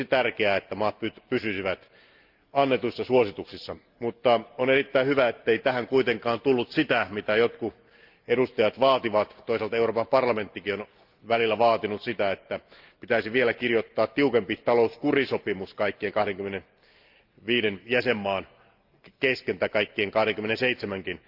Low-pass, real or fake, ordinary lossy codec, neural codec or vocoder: 5.4 kHz; real; Opus, 24 kbps; none